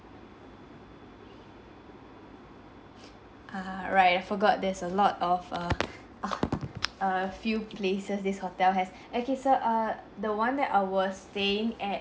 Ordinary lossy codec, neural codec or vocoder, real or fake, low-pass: none; none; real; none